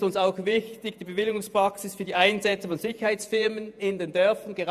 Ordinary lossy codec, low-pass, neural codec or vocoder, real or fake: none; 14.4 kHz; vocoder, 48 kHz, 128 mel bands, Vocos; fake